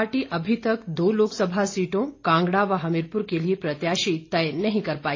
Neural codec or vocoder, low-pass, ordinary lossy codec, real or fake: none; 7.2 kHz; AAC, 32 kbps; real